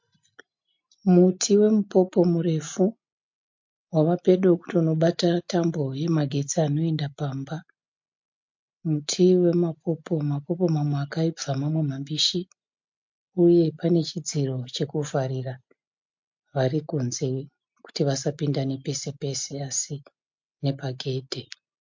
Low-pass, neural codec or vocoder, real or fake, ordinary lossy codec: 7.2 kHz; none; real; MP3, 48 kbps